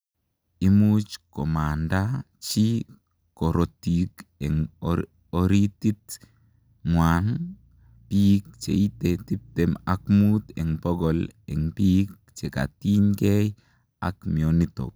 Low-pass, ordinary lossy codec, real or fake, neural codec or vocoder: none; none; real; none